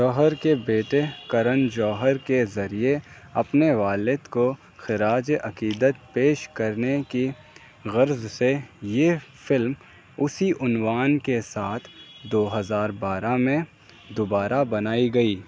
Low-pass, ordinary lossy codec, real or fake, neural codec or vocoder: none; none; real; none